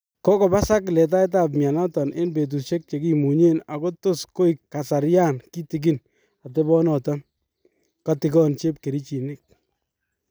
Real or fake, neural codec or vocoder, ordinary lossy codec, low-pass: real; none; none; none